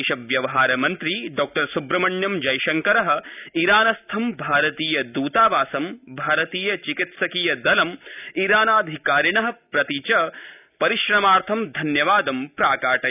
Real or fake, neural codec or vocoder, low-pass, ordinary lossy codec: real; none; 3.6 kHz; none